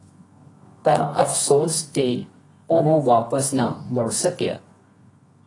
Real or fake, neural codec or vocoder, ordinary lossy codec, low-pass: fake; codec, 24 kHz, 0.9 kbps, WavTokenizer, medium music audio release; AAC, 32 kbps; 10.8 kHz